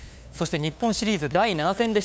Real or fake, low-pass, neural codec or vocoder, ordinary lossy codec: fake; none; codec, 16 kHz, 2 kbps, FunCodec, trained on LibriTTS, 25 frames a second; none